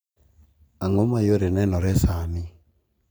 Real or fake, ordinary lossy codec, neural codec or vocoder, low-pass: real; none; none; none